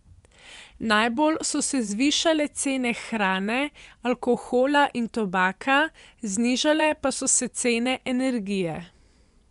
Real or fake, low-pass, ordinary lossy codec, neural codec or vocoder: fake; 10.8 kHz; none; vocoder, 24 kHz, 100 mel bands, Vocos